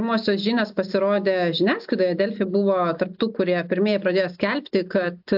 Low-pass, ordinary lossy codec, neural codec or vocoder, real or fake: 5.4 kHz; AAC, 48 kbps; none; real